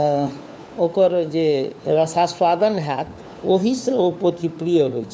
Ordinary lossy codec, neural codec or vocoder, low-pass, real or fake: none; codec, 16 kHz, 4 kbps, FunCodec, trained on LibriTTS, 50 frames a second; none; fake